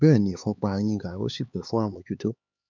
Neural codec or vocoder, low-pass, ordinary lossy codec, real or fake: codec, 16 kHz, 4 kbps, X-Codec, HuBERT features, trained on LibriSpeech; 7.2 kHz; none; fake